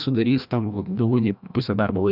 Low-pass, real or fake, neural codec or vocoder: 5.4 kHz; fake; codec, 16 kHz, 1 kbps, FreqCodec, larger model